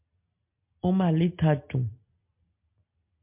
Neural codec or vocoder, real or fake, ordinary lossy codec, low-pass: none; real; MP3, 32 kbps; 3.6 kHz